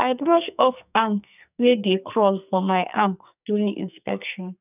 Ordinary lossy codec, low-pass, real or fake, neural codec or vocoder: none; 3.6 kHz; fake; codec, 44.1 kHz, 2.6 kbps, SNAC